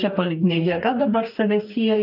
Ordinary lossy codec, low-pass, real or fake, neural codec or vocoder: AAC, 48 kbps; 5.4 kHz; fake; codec, 44.1 kHz, 2.6 kbps, DAC